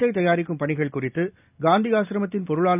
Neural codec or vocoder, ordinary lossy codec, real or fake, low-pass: none; none; real; 3.6 kHz